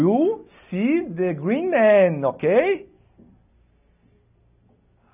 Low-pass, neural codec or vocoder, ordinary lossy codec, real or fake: 3.6 kHz; none; none; real